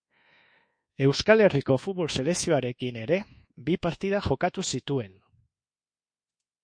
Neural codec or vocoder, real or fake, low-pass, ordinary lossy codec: codec, 24 kHz, 1.2 kbps, DualCodec; fake; 9.9 kHz; MP3, 48 kbps